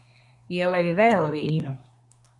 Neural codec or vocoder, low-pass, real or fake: codec, 24 kHz, 1 kbps, SNAC; 10.8 kHz; fake